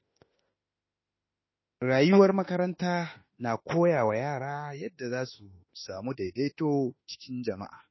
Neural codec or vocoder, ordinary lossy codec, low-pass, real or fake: autoencoder, 48 kHz, 32 numbers a frame, DAC-VAE, trained on Japanese speech; MP3, 24 kbps; 7.2 kHz; fake